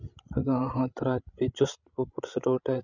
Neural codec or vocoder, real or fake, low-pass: codec, 16 kHz, 16 kbps, FreqCodec, larger model; fake; 7.2 kHz